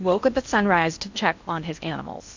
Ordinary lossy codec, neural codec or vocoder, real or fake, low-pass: MP3, 64 kbps; codec, 16 kHz in and 24 kHz out, 0.6 kbps, FocalCodec, streaming, 4096 codes; fake; 7.2 kHz